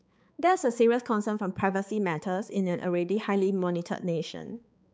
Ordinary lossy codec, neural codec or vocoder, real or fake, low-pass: none; codec, 16 kHz, 4 kbps, X-Codec, HuBERT features, trained on balanced general audio; fake; none